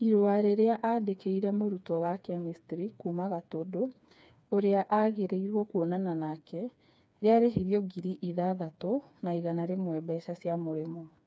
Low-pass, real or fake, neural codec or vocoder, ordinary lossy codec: none; fake; codec, 16 kHz, 4 kbps, FreqCodec, smaller model; none